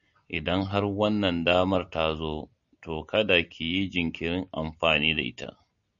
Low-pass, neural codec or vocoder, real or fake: 7.2 kHz; none; real